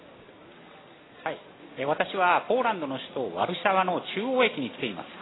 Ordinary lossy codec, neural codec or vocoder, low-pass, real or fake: AAC, 16 kbps; none; 7.2 kHz; real